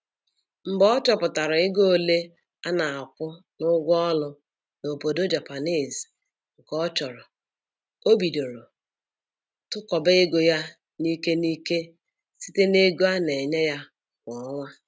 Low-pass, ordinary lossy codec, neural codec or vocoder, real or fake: none; none; none; real